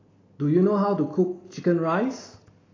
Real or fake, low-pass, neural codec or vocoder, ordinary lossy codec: real; 7.2 kHz; none; AAC, 32 kbps